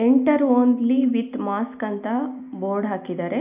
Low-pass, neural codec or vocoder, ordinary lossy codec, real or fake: 3.6 kHz; none; none; real